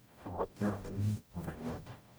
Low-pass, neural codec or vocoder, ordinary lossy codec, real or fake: none; codec, 44.1 kHz, 0.9 kbps, DAC; none; fake